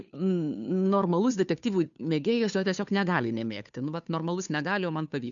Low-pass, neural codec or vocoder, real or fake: 7.2 kHz; codec, 16 kHz, 2 kbps, FunCodec, trained on Chinese and English, 25 frames a second; fake